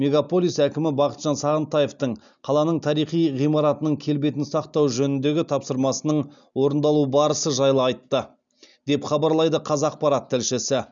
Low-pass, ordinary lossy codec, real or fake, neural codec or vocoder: 7.2 kHz; none; real; none